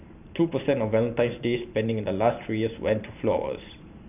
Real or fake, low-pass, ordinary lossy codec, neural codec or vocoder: fake; 3.6 kHz; none; vocoder, 44.1 kHz, 128 mel bands every 512 samples, BigVGAN v2